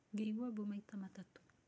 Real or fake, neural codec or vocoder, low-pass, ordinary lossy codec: real; none; none; none